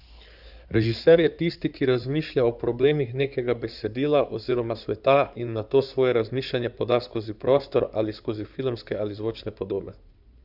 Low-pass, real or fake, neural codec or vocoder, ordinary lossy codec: 5.4 kHz; fake; codec, 16 kHz in and 24 kHz out, 2.2 kbps, FireRedTTS-2 codec; none